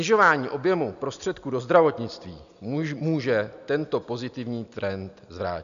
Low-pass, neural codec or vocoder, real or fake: 7.2 kHz; none; real